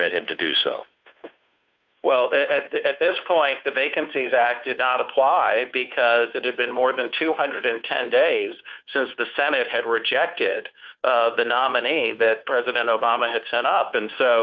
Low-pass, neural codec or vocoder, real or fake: 7.2 kHz; codec, 16 kHz, 2 kbps, FunCodec, trained on Chinese and English, 25 frames a second; fake